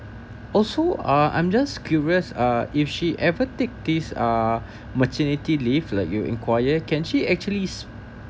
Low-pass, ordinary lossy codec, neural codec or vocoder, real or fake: none; none; none; real